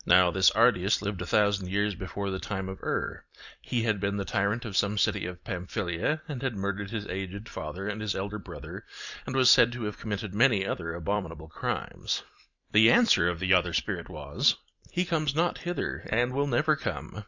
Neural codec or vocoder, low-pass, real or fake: vocoder, 44.1 kHz, 128 mel bands every 256 samples, BigVGAN v2; 7.2 kHz; fake